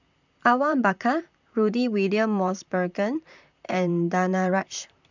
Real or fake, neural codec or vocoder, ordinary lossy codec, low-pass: fake; vocoder, 44.1 kHz, 128 mel bands, Pupu-Vocoder; none; 7.2 kHz